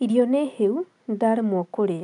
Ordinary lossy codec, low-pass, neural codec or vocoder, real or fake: none; 10.8 kHz; none; real